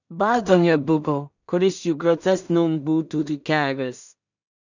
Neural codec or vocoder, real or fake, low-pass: codec, 16 kHz in and 24 kHz out, 0.4 kbps, LongCat-Audio-Codec, two codebook decoder; fake; 7.2 kHz